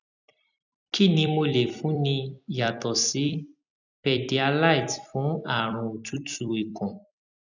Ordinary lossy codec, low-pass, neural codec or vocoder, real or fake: none; 7.2 kHz; vocoder, 44.1 kHz, 128 mel bands every 256 samples, BigVGAN v2; fake